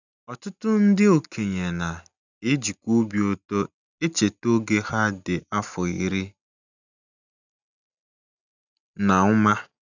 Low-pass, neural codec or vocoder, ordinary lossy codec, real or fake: 7.2 kHz; none; none; real